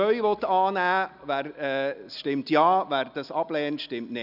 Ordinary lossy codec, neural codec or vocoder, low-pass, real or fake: none; none; 5.4 kHz; real